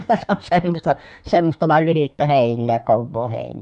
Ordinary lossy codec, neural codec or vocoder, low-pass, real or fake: none; codec, 24 kHz, 1 kbps, SNAC; 10.8 kHz; fake